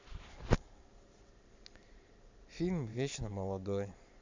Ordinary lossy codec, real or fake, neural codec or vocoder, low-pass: MP3, 64 kbps; fake; vocoder, 44.1 kHz, 128 mel bands every 512 samples, BigVGAN v2; 7.2 kHz